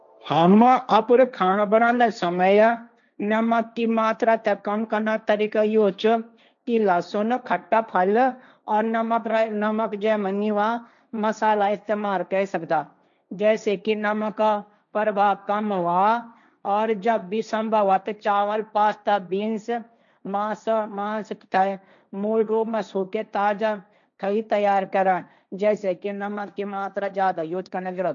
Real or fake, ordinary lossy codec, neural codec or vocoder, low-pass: fake; none; codec, 16 kHz, 1.1 kbps, Voila-Tokenizer; 7.2 kHz